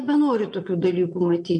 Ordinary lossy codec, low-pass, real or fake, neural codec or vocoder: MP3, 48 kbps; 9.9 kHz; fake; vocoder, 22.05 kHz, 80 mel bands, Vocos